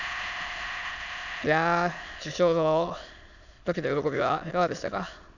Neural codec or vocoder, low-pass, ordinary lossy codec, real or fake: autoencoder, 22.05 kHz, a latent of 192 numbers a frame, VITS, trained on many speakers; 7.2 kHz; none; fake